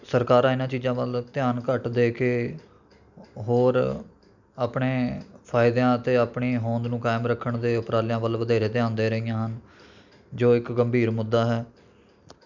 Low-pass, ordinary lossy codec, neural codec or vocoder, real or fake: 7.2 kHz; none; none; real